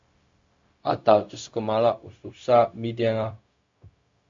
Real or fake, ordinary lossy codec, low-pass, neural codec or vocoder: fake; MP3, 48 kbps; 7.2 kHz; codec, 16 kHz, 0.4 kbps, LongCat-Audio-Codec